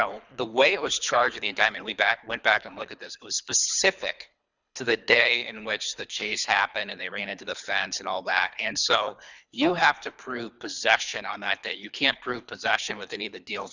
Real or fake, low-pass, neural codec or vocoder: fake; 7.2 kHz; codec, 24 kHz, 3 kbps, HILCodec